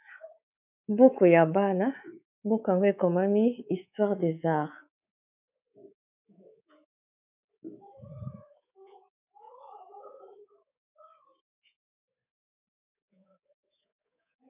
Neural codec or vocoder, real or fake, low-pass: codec, 24 kHz, 3.1 kbps, DualCodec; fake; 3.6 kHz